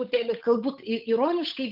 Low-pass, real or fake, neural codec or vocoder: 5.4 kHz; fake; codec, 16 kHz, 8 kbps, FunCodec, trained on Chinese and English, 25 frames a second